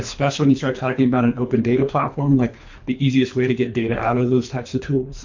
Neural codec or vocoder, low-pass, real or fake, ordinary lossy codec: codec, 24 kHz, 3 kbps, HILCodec; 7.2 kHz; fake; MP3, 48 kbps